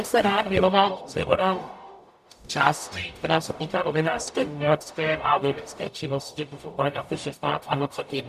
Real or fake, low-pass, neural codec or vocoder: fake; 14.4 kHz; codec, 44.1 kHz, 0.9 kbps, DAC